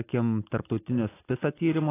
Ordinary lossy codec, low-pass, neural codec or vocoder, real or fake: AAC, 16 kbps; 3.6 kHz; none; real